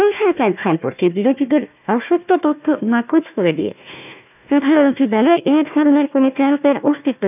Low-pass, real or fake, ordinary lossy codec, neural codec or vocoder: 3.6 kHz; fake; none; codec, 16 kHz, 1 kbps, FunCodec, trained on Chinese and English, 50 frames a second